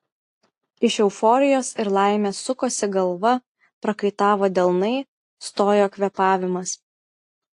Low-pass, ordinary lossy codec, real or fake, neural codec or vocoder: 10.8 kHz; AAC, 48 kbps; real; none